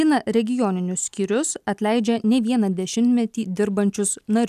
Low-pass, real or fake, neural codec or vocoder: 14.4 kHz; real; none